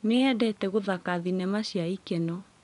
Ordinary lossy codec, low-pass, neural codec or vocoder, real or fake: none; 10.8 kHz; vocoder, 24 kHz, 100 mel bands, Vocos; fake